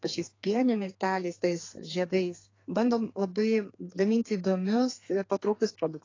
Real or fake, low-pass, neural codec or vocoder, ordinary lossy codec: fake; 7.2 kHz; codec, 32 kHz, 1.9 kbps, SNAC; AAC, 32 kbps